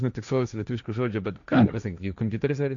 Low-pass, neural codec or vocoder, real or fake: 7.2 kHz; codec, 16 kHz, 1.1 kbps, Voila-Tokenizer; fake